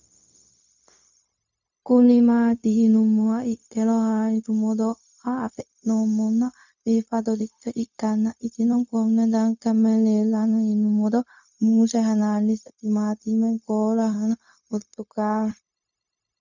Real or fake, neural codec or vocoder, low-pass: fake; codec, 16 kHz, 0.4 kbps, LongCat-Audio-Codec; 7.2 kHz